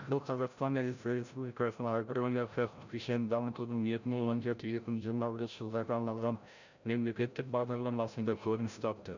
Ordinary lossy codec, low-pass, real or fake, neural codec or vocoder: none; 7.2 kHz; fake; codec, 16 kHz, 0.5 kbps, FreqCodec, larger model